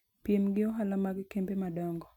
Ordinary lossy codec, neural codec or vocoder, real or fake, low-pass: none; none; real; 19.8 kHz